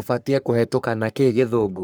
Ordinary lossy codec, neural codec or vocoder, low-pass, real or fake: none; codec, 44.1 kHz, 3.4 kbps, Pupu-Codec; none; fake